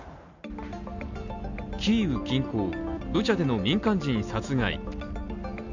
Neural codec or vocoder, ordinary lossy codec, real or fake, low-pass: none; none; real; 7.2 kHz